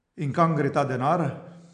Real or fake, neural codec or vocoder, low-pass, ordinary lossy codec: real; none; 9.9 kHz; MP3, 64 kbps